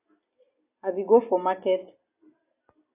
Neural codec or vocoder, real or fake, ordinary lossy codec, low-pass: none; real; AAC, 24 kbps; 3.6 kHz